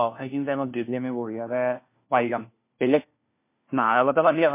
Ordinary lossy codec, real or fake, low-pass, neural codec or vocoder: MP3, 24 kbps; fake; 3.6 kHz; codec, 16 kHz, 1 kbps, FunCodec, trained on LibriTTS, 50 frames a second